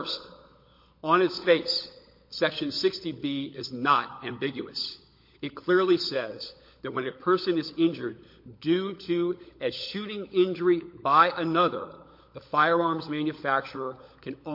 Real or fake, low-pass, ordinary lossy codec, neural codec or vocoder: fake; 5.4 kHz; MP3, 32 kbps; codec, 16 kHz, 16 kbps, FunCodec, trained on LibriTTS, 50 frames a second